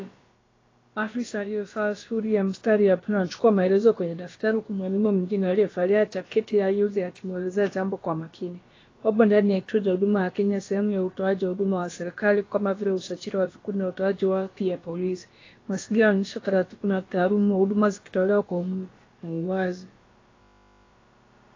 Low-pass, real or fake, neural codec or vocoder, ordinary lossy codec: 7.2 kHz; fake; codec, 16 kHz, about 1 kbps, DyCAST, with the encoder's durations; AAC, 32 kbps